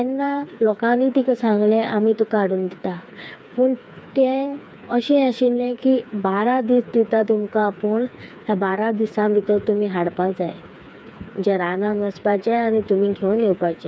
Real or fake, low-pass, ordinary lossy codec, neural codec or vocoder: fake; none; none; codec, 16 kHz, 4 kbps, FreqCodec, smaller model